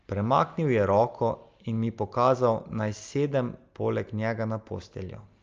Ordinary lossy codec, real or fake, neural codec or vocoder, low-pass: Opus, 24 kbps; real; none; 7.2 kHz